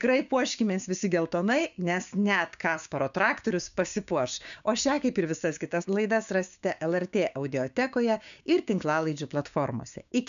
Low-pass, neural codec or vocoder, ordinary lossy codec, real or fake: 7.2 kHz; codec, 16 kHz, 6 kbps, DAC; MP3, 96 kbps; fake